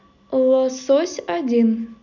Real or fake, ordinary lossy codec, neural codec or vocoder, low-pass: real; none; none; 7.2 kHz